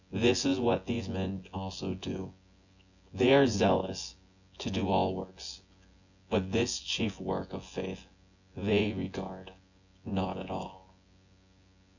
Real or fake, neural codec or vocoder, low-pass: fake; vocoder, 24 kHz, 100 mel bands, Vocos; 7.2 kHz